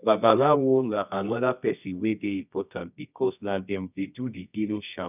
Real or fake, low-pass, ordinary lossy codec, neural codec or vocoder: fake; 3.6 kHz; none; codec, 24 kHz, 0.9 kbps, WavTokenizer, medium music audio release